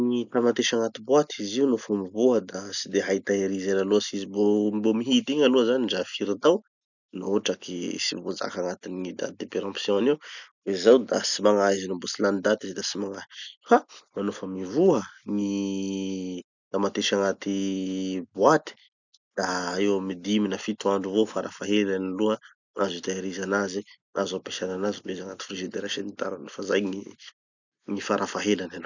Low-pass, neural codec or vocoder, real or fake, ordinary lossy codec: 7.2 kHz; none; real; none